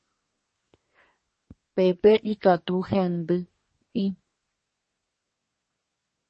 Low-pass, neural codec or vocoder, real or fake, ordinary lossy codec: 10.8 kHz; codec, 24 kHz, 1 kbps, SNAC; fake; MP3, 32 kbps